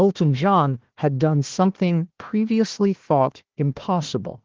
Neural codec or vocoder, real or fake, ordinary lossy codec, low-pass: codec, 16 kHz, 1 kbps, FunCodec, trained on Chinese and English, 50 frames a second; fake; Opus, 16 kbps; 7.2 kHz